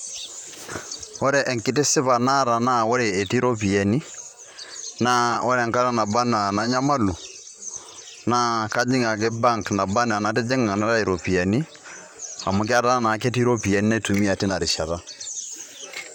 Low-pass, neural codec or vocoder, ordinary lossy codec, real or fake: 19.8 kHz; vocoder, 44.1 kHz, 128 mel bands, Pupu-Vocoder; none; fake